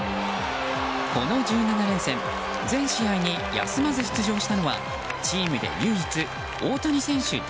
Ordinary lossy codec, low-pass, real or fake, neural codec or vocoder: none; none; real; none